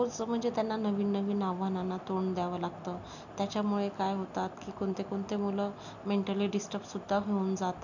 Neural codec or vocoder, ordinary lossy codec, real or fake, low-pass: none; none; real; 7.2 kHz